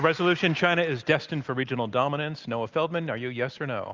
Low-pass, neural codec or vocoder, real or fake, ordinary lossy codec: 7.2 kHz; none; real; Opus, 32 kbps